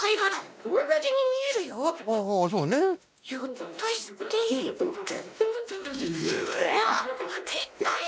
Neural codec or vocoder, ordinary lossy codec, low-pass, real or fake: codec, 16 kHz, 1 kbps, X-Codec, WavLM features, trained on Multilingual LibriSpeech; none; none; fake